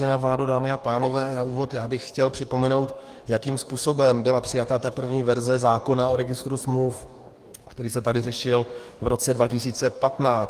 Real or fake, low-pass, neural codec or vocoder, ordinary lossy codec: fake; 14.4 kHz; codec, 44.1 kHz, 2.6 kbps, DAC; Opus, 32 kbps